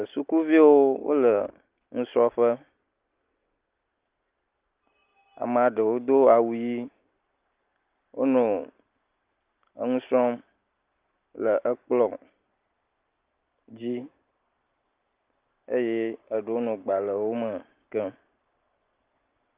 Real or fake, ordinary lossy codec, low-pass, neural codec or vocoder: real; Opus, 32 kbps; 3.6 kHz; none